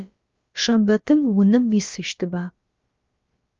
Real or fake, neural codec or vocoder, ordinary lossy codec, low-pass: fake; codec, 16 kHz, about 1 kbps, DyCAST, with the encoder's durations; Opus, 32 kbps; 7.2 kHz